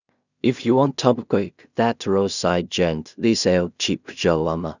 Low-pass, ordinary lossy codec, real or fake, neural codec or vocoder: 7.2 kHz; none; fake; codec, 16 kHz in and 24 kHz out, 0.4 kbps, LongCat-Audio-Codec, two codebook decoder